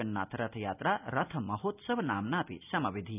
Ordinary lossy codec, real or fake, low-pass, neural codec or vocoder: none; real; 3.6 kHz; none